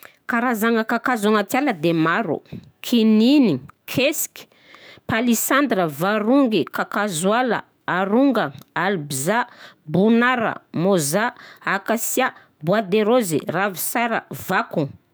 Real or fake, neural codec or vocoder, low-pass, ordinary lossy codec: fake; autoencoder, 48 kHz, 128 numbers a frame, DAC-VAE, trained on Japanese speech; none; none